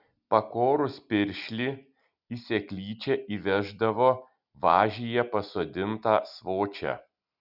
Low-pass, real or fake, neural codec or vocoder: 5.4 kHz; real; none